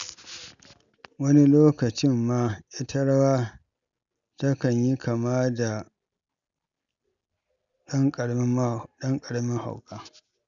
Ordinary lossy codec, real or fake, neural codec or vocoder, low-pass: none; real; none; 7.2 kHz